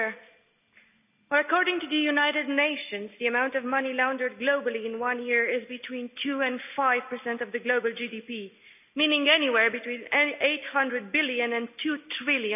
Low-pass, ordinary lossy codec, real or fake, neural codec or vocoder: 3.6 kHz; none; real; none